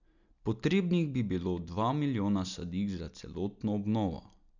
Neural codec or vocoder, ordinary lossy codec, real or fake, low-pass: none; none; real; 7.2 kHz